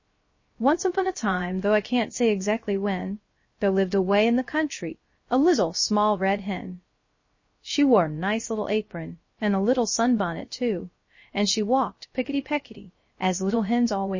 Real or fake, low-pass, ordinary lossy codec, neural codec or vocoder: fake; 7.2 kHz; MP3, 32 kbps; codec, 16 kHz, 0.3 kbps, FocalCodec